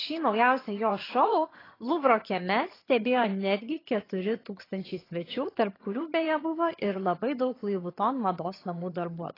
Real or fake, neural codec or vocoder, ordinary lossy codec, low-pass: fake; vocoder, 22.05 kHz, 80 mel bands, HiFi-GAN; AAC, 24 kbps; 5.4 kHz